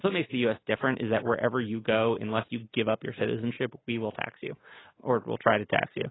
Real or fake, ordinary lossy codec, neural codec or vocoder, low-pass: real; AAC, 16 kbps; none; 7.2 kHz